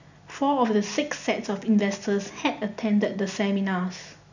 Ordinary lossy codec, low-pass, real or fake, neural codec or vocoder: none; 7.2 kHz; real; none